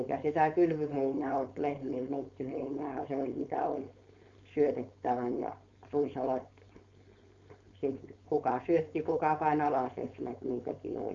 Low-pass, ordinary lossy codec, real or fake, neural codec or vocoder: 7.2 kHz; Opus, 64 kbps; fake; codec, 16 kHz, 4.8 kbps, FACodec